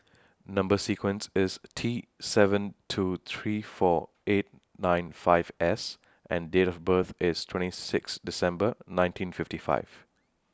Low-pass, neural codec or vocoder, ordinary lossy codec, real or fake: none; none; none; real